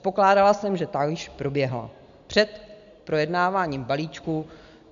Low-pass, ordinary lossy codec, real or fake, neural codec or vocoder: 7.2 kHz; MP3, 64 kbps; real; none